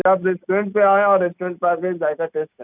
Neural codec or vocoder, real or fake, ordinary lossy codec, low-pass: none; real; none; 3.6 kHz